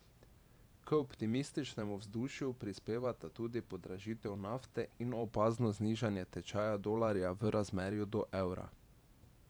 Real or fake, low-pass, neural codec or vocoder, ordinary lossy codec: fake; none; vocoder, 44.1 kHz, 128 mel bands every 512 samples, BigVGAN v2; none